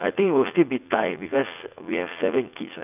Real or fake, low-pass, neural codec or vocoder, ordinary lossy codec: fake; 3.6 kHz; vocoder, 44.1 kHz, 80 mel bands, Vocos; none